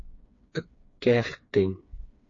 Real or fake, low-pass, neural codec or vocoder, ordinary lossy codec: fake; 7.2 kHz; codec, 16 kHz, 4 kbps, FreqCodec, smaller model; AAC, 48 kbps